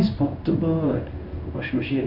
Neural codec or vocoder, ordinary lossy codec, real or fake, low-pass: codec, 16 kHz in and 24 kHz out, 1 kbps, XY-Tokenizer; none; fake; 5.4 kHz